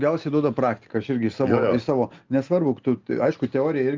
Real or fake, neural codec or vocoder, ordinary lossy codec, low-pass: real; none; Opus, 24 kbps; 7.2 kHz